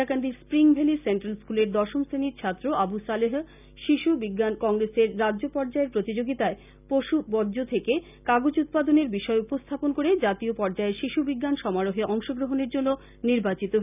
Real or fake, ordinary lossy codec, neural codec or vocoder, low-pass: real; none; none; 3.6 kHz